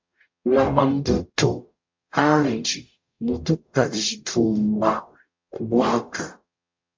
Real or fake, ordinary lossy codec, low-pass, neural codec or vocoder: fake; MP3, 48 kbps; 7.2 kHz; codec, 44.1 kHz, 0.9 kbps, DAC